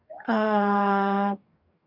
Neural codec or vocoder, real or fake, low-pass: codec, 44.1 kHz, 2.6 kbps, DAC; fake; 5.4 kHz